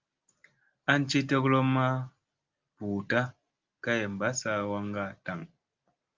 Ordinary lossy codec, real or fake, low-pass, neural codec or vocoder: Opus, 24 kbps; real; 7.2 kHz; none